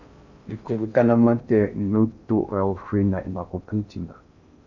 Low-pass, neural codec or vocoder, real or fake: 7.2 kHz; codec, 16 kHz in and 24 kHz out, 0.6 kbps, FocalCodec, streaming, 4096 codes; fake